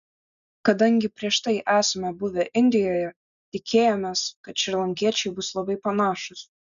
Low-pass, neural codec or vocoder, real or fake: 7.2 kHz; none; real